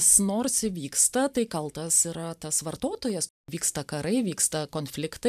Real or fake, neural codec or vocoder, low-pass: fake; vocoder, 44.1 kHz, 128 mel bands every 512 samples, BigVGAN v2; 14.4 kHz